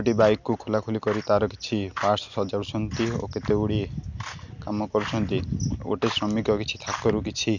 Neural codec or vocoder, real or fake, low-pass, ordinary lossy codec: none; real; 7.2 kHz; none